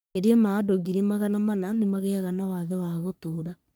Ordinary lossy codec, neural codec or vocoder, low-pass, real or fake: none; codec, 44.1 kHz, 3.4 kbps, Pupu-Codec; none; fake